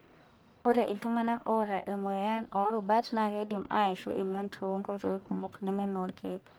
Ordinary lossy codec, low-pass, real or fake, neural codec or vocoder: none; none; fake; codec, 44.1 kHz, 1.7 kbps, Pupu-Codec